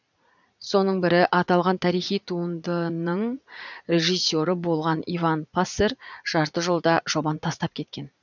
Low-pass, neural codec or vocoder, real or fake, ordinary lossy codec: 7.2 kHz; vocoder, 44.1 kHz, 80 mel bands, Vocos; fake; none